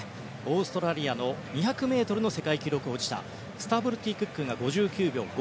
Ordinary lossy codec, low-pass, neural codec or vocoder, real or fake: none; none; none; real